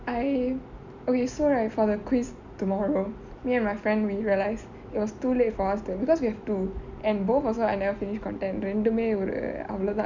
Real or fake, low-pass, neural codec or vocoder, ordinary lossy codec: real; 7.2 kHz; none; none